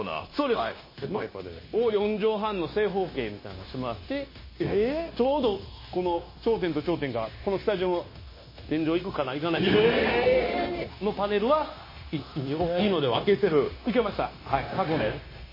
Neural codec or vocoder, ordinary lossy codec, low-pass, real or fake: codec, 16 kHz, 0.9 kbps, LongCat-Audio-Codec; MP3, 24 kbps; 5.4 kHz; fake